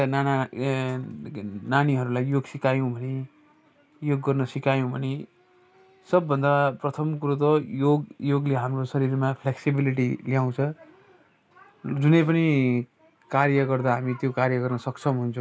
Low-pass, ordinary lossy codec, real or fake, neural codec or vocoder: none; none; real; none